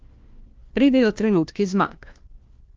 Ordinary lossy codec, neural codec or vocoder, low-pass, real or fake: Opus, 24 kbps; codec, 16 kHz, 1 kbps, FunCodec, trained on LibriTTS, 50 frames a second; 7.2 kHz; fake